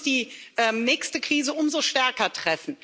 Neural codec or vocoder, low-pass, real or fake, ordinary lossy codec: none; none; real; none